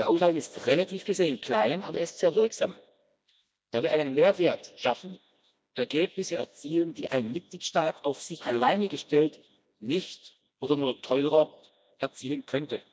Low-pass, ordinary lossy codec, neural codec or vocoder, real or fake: none; none; codec, 16 kHz, 1 kbps, FreqCodec, smaller model; fake